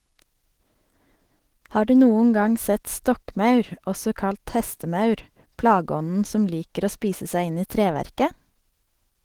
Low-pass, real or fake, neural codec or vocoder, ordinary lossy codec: 19.8 kHz; fake; autoencoder, 48 kHz, 128 numbers a frame, DAC-VAE, trained on Japanese speech; Opus, 16 kbps